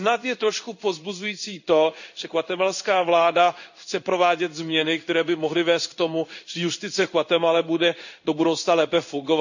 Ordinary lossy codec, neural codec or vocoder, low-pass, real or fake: none; codec, 16 kHz in and 24 kHz out, 1 kbps, XY-Tokenizer; 7.2 kHz; fake